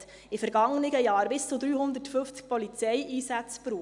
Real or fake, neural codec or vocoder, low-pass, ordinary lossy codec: real; none; 10.8 kHz; none